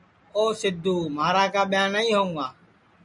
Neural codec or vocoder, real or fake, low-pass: none; real; 10.8 kHz